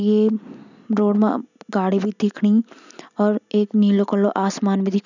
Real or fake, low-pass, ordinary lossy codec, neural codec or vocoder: real; 7.2 kHz; none; none